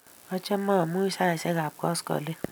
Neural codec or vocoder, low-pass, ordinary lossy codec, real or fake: none; none; none; real